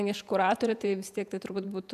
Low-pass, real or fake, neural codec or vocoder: 14.4 kHz; fake; vocoder, 48 kHz, 128 mel bands, Vocos